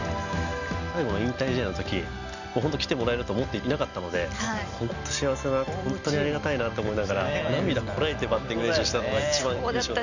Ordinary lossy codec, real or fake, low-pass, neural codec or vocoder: none; real; 7.2 kHz; none